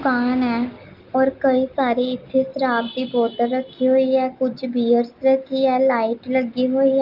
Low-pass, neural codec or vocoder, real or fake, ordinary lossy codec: 5.4 kHz; none; real; Opus, 32 kbps